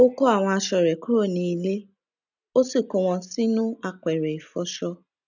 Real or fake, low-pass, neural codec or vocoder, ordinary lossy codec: real; 7.2 kHz; none; none